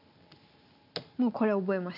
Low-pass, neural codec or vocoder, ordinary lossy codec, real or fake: 5.4 kHz; none; none; real